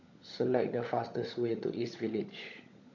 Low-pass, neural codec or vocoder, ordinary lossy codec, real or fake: 7.2 kHz; codec, 16 kHz, 16 kbps, FunCodec, trained on LibriTTS, 50 frames a second; none; fake